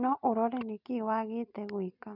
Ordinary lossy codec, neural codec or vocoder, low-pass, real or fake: none; none; 5.4 kHz; real